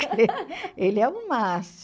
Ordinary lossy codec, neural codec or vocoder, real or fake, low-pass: none; none; real; none